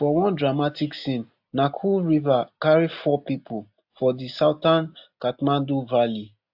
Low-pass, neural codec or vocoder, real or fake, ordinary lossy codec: 5.4 kHz; none; real; AAC, 48 kbps